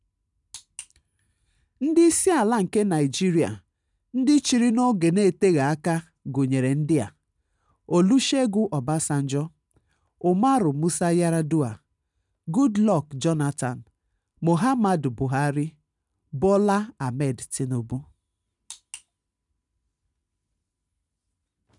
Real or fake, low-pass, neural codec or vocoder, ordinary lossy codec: real; 10.8 kHz; none; none